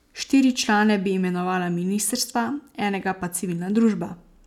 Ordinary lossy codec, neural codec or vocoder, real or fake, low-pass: none; none; real; 19.8 kHz